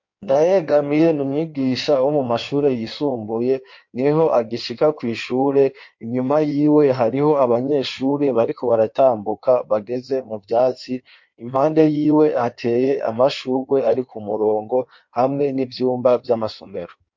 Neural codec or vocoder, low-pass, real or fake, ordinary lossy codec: codec, 16 kHz in and 24 kHz out, 1.1 kbps, FireRedTTS-2 codec; 7.2 kHz; fake; MP3, 48 kbps